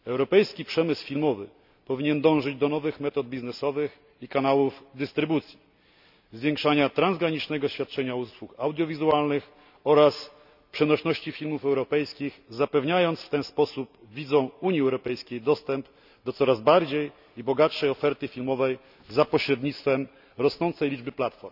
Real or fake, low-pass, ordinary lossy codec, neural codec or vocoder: real; 5.4 kHz; none; none